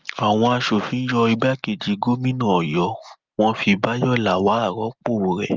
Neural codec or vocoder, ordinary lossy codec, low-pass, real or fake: none; Opus, 24 kbps; 7.2 kHz; real